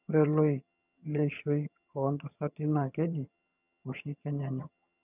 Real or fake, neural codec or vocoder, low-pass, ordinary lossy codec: fake; vocoder, 22.05 kHz, 80 mel bands, HiFi-GAN; 3.6 kHz; none